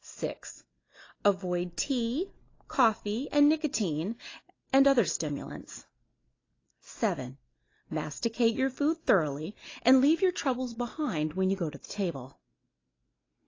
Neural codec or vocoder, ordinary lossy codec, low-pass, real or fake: none; AAC, 32 kbps; 7.2 kHz; real